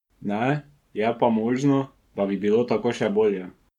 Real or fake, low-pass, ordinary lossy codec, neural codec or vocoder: fake; 19.8 kHz; MP3, 96 kbps; codec, 44.1 kHz, 7.8 kbps, Pupu-Codec